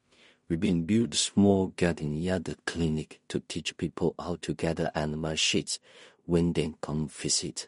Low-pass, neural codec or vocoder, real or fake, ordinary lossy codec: 10.8 kHz; codec, 16 kHz in and 24 kHz out, 0.4 kbps, LongCat-Audio-Codec, two codebook decoder; fake; MP3, 48 kbps